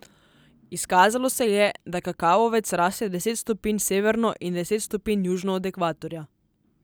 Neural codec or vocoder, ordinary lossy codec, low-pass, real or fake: none; none; none; real